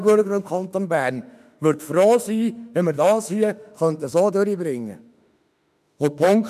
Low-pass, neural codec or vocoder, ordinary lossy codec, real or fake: 14.4 kHz; autoencoder, 48 kHz, 32 numbers a frame, DAC-VAE, trained on Japanese speech; none; fake